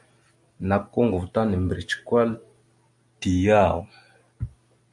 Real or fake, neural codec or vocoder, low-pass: real; none; 10.8 kHz